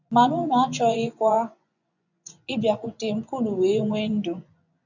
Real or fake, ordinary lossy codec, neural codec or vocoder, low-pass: fake; none; vocoder, 24 kHz, 100 mel bands, Vocos; 7.2 kHz